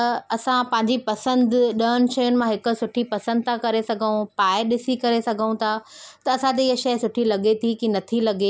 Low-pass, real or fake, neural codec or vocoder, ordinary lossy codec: none; real; none; none